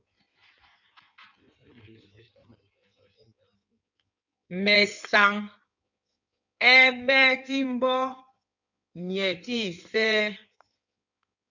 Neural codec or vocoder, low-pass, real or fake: codec, 16 kHz in and 24 kHz out, 1.1 kbps, FireRedTTS-2 codec; 7.2 kHz; fake